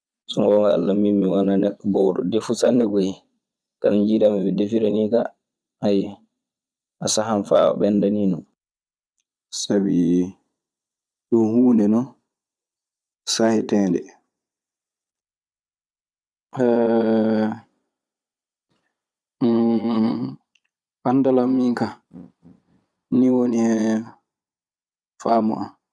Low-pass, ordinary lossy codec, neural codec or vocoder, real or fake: none; none; vocoder, 22.05 kHz, 80 mel bands, Vocos; fake